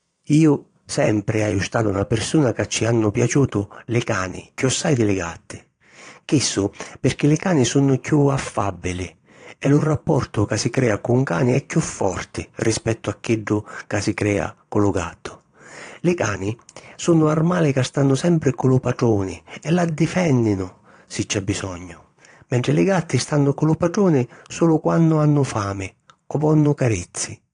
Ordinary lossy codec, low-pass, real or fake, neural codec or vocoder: AAC, 48 kbps; 9.9 kHz; fake; vocoder, 22.05 kHz, 80 mel bands, Vocos